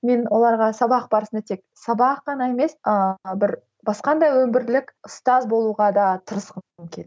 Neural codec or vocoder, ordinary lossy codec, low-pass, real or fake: none; none; none; real